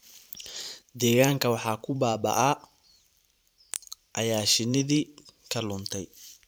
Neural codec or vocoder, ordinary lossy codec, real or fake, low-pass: vocoder, 44.1 kHz, 128 mel bands every 512 samples, BigVGAN v2; none; fake; none